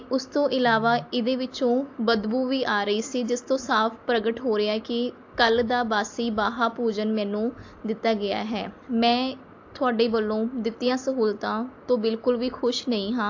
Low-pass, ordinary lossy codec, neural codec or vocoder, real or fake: 7.2 kHz; AAC, 48 kbps; none; real